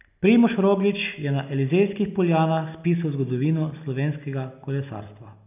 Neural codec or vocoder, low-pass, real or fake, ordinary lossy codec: none; 3.6 kHz; real; none